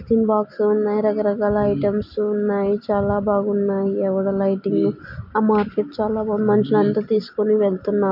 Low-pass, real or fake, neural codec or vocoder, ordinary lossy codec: 5.4 kHz; real; none; MP3, 48 kbps